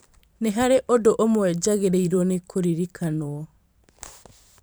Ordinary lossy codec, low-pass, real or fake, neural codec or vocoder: none; none; fake; vocoder, 44.1 kHz, 128 mel bands every 512 samples, BigVGAN v2